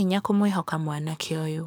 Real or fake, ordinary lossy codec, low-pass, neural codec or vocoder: fake; none; none; codec, 44.1 kHz, 7.8 kbps, DAC